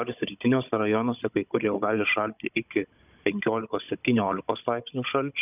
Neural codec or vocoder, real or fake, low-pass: codec, 16 kHz, 16 kbps, FunCodec, trained on Chinese and English, 50 frames a second; fake; 3.6 kHz